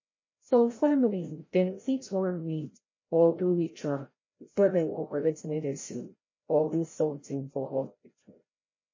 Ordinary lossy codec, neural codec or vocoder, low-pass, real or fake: MP3, 32 kbps; codec, 16 kHz, 0.5 kbps, FreqCodec, larger model; 7.2 kHz; fake